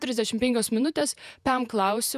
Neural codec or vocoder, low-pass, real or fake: vocoder, 48 kHz, 128 mel bands, Vocos; 14.4 kHz; fake